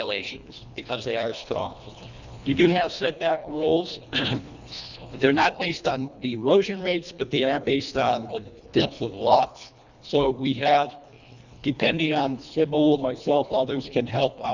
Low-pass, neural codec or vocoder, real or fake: 7.2 kHz; codec, 24 kHz, 1.5 kbps, HILCodec; fake